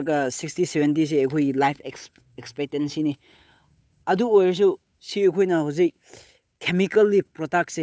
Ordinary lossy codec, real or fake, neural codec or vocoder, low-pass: none; fake; codec, 16 kHz, 8 kbps, FunCodec, trained on Chinese and English, 25 frames a second; none